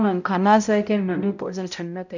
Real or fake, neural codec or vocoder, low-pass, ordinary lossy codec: fake; codec, 16 kHz, 0.5 kbps, X-Codec, HuBERT features, trained on balanced general audio; 7.2 kHz; none